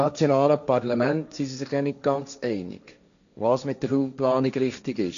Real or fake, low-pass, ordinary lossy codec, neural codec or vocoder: fake; 7.2 kHz; none; codec, 16 kHz, 1.1 kbps, Voila-Tokenizer